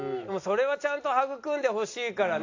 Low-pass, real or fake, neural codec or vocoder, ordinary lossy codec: 7.2 kHz; real; none; AAC, 48 kbps